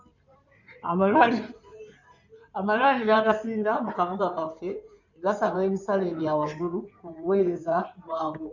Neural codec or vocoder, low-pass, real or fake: codec, 16 kHz in and 24 kHz out, 2.2 kbps, FireRedTTS-2 codec; 7.2 kHz; fake